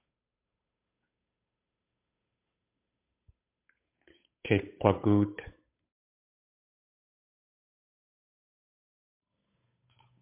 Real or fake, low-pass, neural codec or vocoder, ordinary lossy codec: fake; 3.6 kHz; codec, 16 kHz, 8 kbps, FunCodec, trained on Chinese and English, 25 frames a second; MP3, 32 kbps